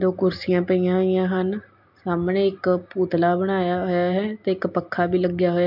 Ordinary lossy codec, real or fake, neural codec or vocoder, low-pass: MP3, 48 kbps; real; none; 5.4 kHz